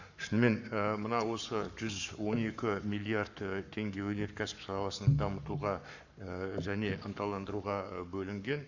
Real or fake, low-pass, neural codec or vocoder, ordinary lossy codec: fake; 7.2 kHz; codec, 44.1 kHz, 7.8 kbps, Pupu-Codec; none